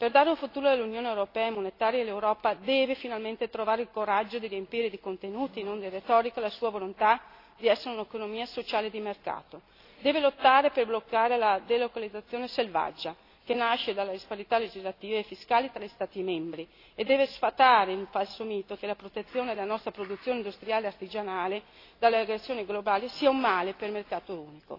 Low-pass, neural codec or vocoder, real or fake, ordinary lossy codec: 5.4 kHz; none; real; AAC, 32 kbps